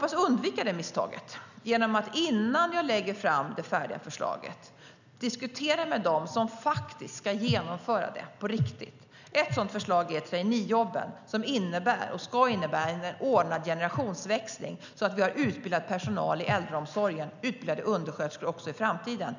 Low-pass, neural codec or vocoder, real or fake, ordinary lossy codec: 7.2 kHz; none; real; none